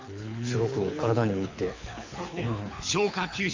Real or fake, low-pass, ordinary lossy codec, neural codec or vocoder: fake; 7.2 kHz; MP3, 48 kbps; codec, 24 kHz, 6 kbps, HILCodec